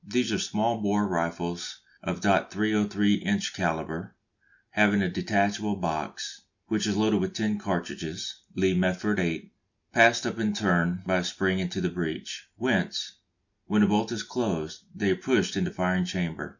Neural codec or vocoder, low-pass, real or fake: none; 7.2 kHz; real